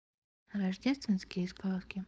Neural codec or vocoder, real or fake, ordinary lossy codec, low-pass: codec, 16 kHz, 4.8 kbps, FACodec; fake; none; none